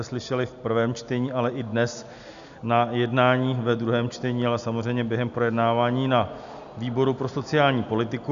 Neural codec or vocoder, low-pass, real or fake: none; 7.2 kHz; real